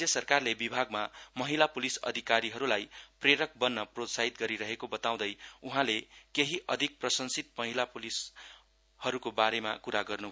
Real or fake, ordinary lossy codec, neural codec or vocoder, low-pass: real; none; none; none